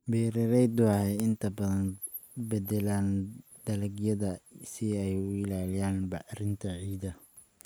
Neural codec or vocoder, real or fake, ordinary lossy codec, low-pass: none; real; none; none